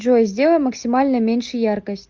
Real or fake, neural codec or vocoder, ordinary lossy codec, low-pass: real; none; Opus, 32 kbps; 7.2 kHz